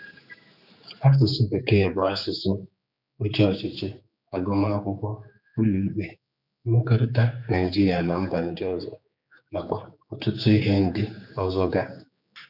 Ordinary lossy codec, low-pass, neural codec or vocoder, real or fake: none; 5.4 kHz; codec, 16 kHz, 4 kbps, X-Codec, HuBERT features, trained on general audio; fake